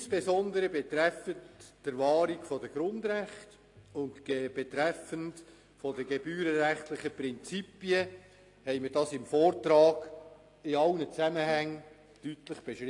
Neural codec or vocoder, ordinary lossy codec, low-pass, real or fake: none; AAC, 48 kbps; 10.8 kHz; real